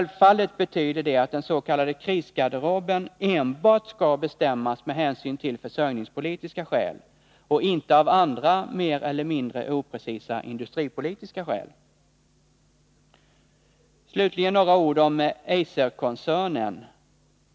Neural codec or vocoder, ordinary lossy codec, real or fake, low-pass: none; none; real; none